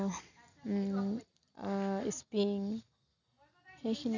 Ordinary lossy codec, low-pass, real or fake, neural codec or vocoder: none; 7.2 kHz; real; none